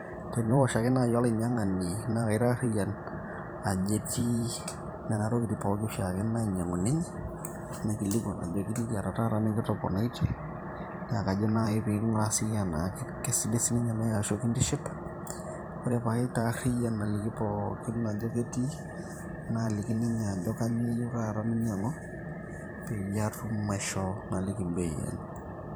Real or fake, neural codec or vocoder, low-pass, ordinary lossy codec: fake; vocoder, 44.1 kHz, 128 mel bands every 512 samples, BigVGAN v2; none; none